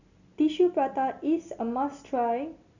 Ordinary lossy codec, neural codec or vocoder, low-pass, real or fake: none; none; 7.2 kHz; real